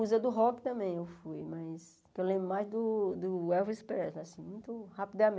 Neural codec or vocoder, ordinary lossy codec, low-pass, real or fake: none; none; none; real